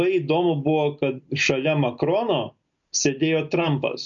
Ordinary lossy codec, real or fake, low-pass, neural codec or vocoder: MP3, 48 kbps; real; 7.2 kHz; none